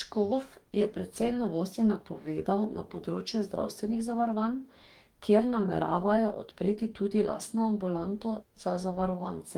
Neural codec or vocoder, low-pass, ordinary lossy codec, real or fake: codec, 44.1 kHz, 2.6 kbps, DAC; 19.8 kHz; Opus, 32 kbps; fake